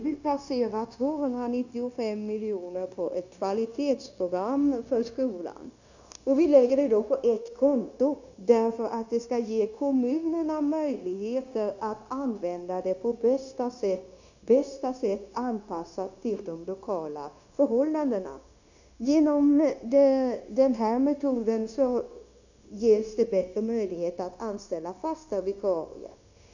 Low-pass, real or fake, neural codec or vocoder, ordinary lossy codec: 7.2 kHz; fake; codec, 16 kHz, 0.9 kbps, LongCat-Audio-Codec; none